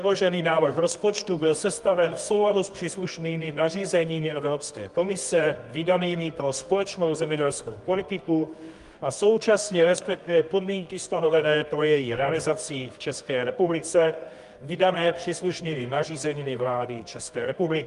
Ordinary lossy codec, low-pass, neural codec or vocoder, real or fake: Opus, 32 kbps; 10.8 kHz; codec, 24 kHz, 0.9 kbps, WavTokenizer, medium music audio release; fake